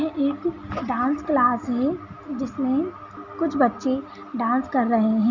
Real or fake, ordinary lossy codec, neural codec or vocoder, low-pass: real; none; none; 7.2 kHz